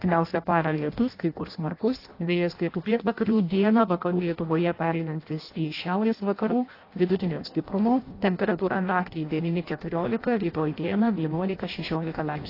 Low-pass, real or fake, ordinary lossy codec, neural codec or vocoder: 5.4 kHz; fake; AAC, 32 kbps; codec, 16 kHz in and 24 kHz out, 0.6 kbps, FireRedTTS-2 codec